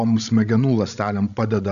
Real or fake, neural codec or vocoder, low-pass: fake; codec, 16 kHz, 16 kbps, FreqCodec, larger model; 7.2 kHz